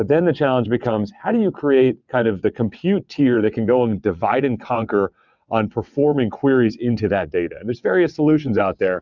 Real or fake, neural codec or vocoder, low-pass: fake; vocoder, 22.05 kHz, 80 mel bands, WaveNeXt; 7.2 kHz